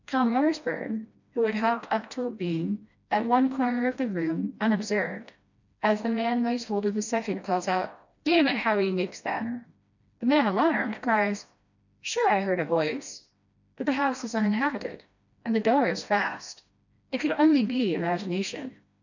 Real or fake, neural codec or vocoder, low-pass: fake; codec, 16 kHz, 1 kbps, FreqCodec, smaller model; 7.2 kHz